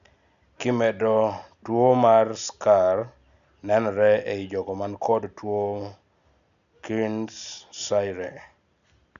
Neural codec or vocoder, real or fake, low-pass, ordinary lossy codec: none; real; 7.2 kHz; none